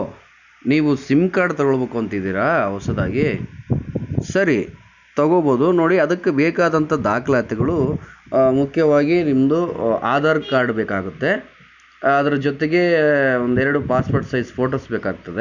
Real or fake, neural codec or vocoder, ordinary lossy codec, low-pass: real; none; none; 7.2 kHz